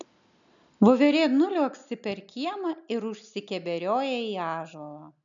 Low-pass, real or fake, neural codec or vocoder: 7.2 kHz; real; none